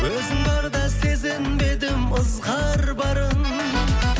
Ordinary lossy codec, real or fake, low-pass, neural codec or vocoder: none; real; none; none